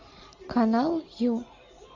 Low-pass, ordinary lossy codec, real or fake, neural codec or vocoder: 7.2 kHz; AAC, 48 kbps; real; none